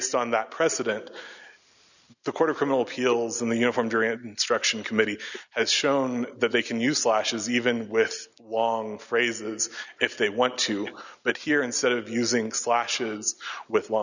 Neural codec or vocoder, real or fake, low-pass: none; real; 7.2 kHz